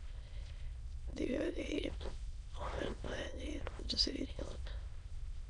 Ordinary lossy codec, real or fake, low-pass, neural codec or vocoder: none; fake; 9.9 kHz; autoencoder, 22.05 kHz, a latent of 192 numbers a frame, VITS, trained on many speakers